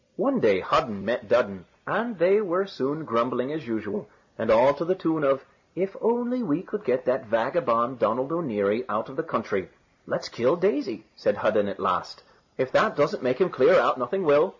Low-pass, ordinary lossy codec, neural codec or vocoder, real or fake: 7.2 kHz; MP3, 32 kbps; none; real